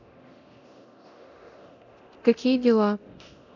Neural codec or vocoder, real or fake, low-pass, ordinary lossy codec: codec, 24 kHz, 0.9 kbps, DualCodec; fake; 7.2 kHz; none